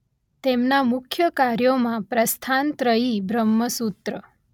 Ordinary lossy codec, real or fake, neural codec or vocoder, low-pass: none; real; none; 19.8 kHz